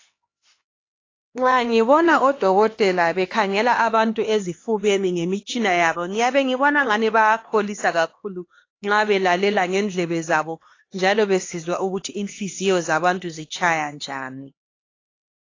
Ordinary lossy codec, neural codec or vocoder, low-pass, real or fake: AAC, 32 kbps; codec, 16 kHz, 2 kbps, X-Codec, HuBERT features, trained on LibriSpeech; 7.2 kHz; fake